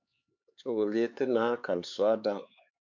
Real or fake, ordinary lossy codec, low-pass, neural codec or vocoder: fake; MP3, 64 kbps; 7.2 kHz; codec, 16 kHz, 4 kbps, X-Codec, HuBERT features, trained on LibriSpeech